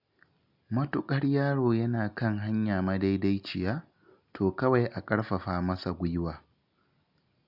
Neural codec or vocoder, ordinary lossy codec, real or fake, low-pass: none; none; real; 5.4 kHz